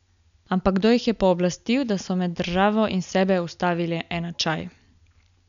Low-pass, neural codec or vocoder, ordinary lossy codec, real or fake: 7.2 kHz; none; none; real